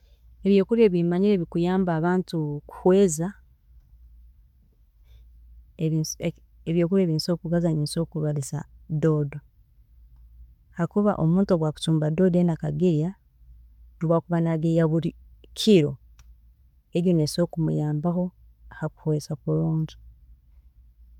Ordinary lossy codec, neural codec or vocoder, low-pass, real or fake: none; none; 19.8 kHz; real